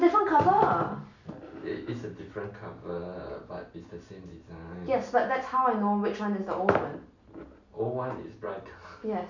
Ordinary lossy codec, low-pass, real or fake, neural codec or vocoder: none; 7.2 kHz; real; none